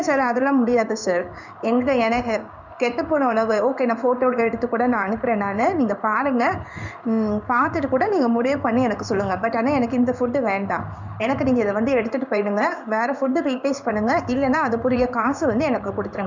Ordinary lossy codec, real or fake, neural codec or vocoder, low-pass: none; fake; codec, 16 kHz in and 24 kHz out, 1 kbps, XY-Tokenizer; 7.2 kHz